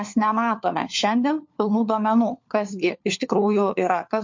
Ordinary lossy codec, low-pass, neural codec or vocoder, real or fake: MP3, 48 kbps; 7.2 kHz; codec, 16 kHz, 4 kbps, FunCodec, trained on LibriTTS, 50 frames a second; fake